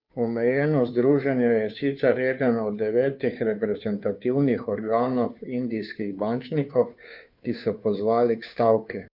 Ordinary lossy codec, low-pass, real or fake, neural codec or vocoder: none; 5.4 kHz; fake; codec, 16 kHz, 2 kbps, FunCodec, trained on Chinese and English, 25 frames a second